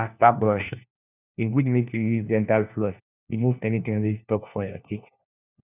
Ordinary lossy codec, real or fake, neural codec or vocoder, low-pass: none; fake; codec, 16 kHz, 1 kbps, FunCodec, trained on LibriTTS, 50 frames a second; 3.6 kHz